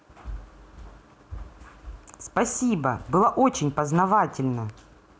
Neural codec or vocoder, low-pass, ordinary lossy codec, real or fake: none; none; none; real